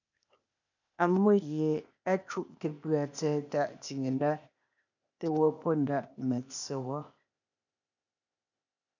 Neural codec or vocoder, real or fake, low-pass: codec, 16 kHz, 0.8 kbps, ZipCodec; fake; 7.2 kHz